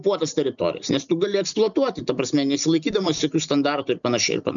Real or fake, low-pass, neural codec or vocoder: real; 7.2 kHz; none